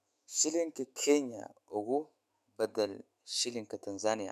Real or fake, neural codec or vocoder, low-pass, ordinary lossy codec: fake; autoencoder, 48 kHz, 128 numbers a frame, DAC-VAE, trained on Japanese speech; 14.4 kHz; MP3, 96 kbps